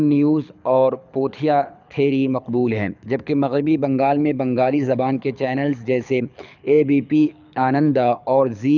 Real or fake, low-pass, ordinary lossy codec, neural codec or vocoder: fake; 7.2 kHz; none; codec, 24 kHz, 6 kbps, HILCodec